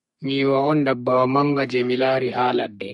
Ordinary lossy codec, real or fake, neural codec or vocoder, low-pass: MP3, 48 kbps; fake; codec, 32 kHz, 1.9 kbps, SNAC; 14.4 kHz